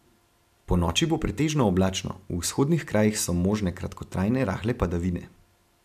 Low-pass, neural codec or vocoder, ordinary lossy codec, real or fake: 14.4 kHz; none; AAC, 96 kbps; real